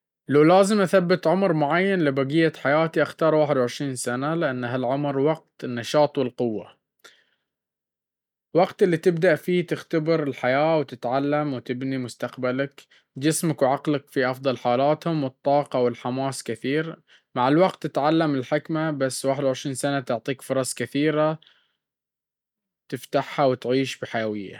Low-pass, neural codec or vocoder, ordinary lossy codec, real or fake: 19.8 kHz; none; none; real